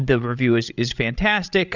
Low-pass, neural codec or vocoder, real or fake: 7.2 kHz; codec, 16 kHz, 16 kbps, FreqCodec, larger model; fake